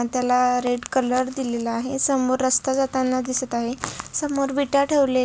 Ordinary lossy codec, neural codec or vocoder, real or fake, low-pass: none; none; real; none